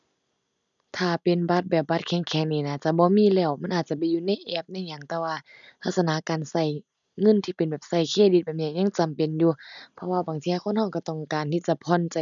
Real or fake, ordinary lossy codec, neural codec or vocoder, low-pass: real; none; none; 7.2 kHz